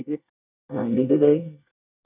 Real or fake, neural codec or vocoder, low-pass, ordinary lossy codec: fake; codec, 24 kHz, 1 kbps, SNAC; 3.6 kHz; none